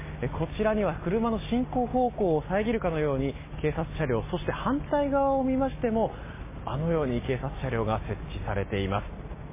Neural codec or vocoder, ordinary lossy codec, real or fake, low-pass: none; MP3, 16 kbps; real; 3.6 kHz